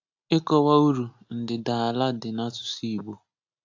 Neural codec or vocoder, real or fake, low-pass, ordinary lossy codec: none; real; 7.2 kHz; AAC, 48 kbps